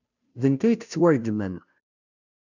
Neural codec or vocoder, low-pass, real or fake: codec, 16 kHz, 0.5 kbps, FunCodec, trained on Chinese and English, 25 frames a second; 7.2 kHz; fake